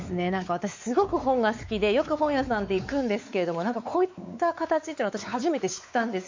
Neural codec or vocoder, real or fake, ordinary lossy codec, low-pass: codec, 16 kHz, 4 kbps, X-Codec, WavLM features, trained on Multilingual LibriSpeech; fake; MP3, 64 kbps; 7.2 kHz